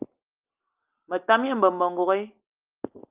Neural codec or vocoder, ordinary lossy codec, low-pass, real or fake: none; Opus, 24 kbps; 3.6 kHz; real